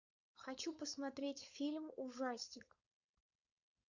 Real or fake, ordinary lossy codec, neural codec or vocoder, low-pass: fake; Opus, 64 kbps; codec, 16 kHz, 4.8 kbps, FACodec; 7.2 kHz